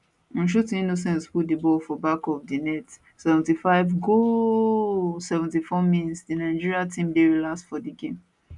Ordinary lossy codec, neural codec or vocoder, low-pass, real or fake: none; none; 10.8 kHz; real